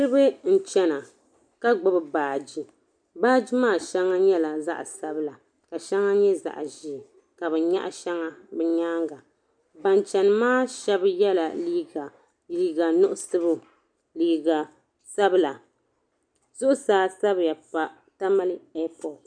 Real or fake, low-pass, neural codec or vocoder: real; 9.9 kHz; none